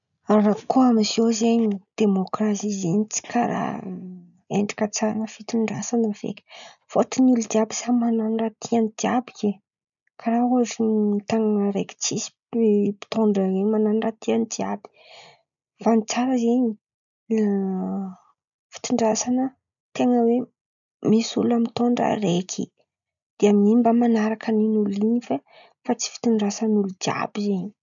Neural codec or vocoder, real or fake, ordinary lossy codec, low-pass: none; real; none; 7.2 kHz